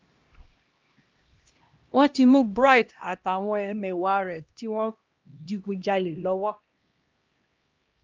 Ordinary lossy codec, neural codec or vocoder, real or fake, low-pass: Opus, 24 kbps; codec, 16 kHz, 1 kbps, X-Codec, HuBERT features, trained on LibriSpeech; fake; 7.2 kHz